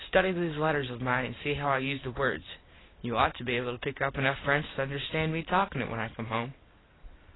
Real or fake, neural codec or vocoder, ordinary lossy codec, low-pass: real; none; AAC, 16 kbps; 7.2 kHz